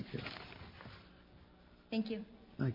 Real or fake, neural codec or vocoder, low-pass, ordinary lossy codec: real; none; 5.4 kHz; MP3, 48 kbps